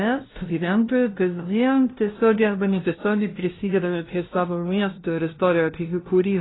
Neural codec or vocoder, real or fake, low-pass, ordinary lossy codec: codec, 16 kHz, 0.5 kbps, FunCodec, trained on LibriTTS, 25 frames a second; fake; 7.2 kHz; AAC, 16 kbps